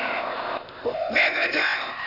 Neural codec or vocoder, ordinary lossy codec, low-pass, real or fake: codec, 16 kHz, 0.8 kbps, ZipCodec; Opus, 64 kbps; 5.4 kHz; fake